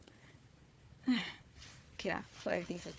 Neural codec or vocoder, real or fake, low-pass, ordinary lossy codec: codec, 16 kHz, 4 kbps, FunCodec, trained on Chinese and English, 50 frames a second; fake; none; none